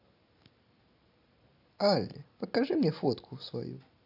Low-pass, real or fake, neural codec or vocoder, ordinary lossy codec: 5.4 kHz; real; none; AAC, 48 kbps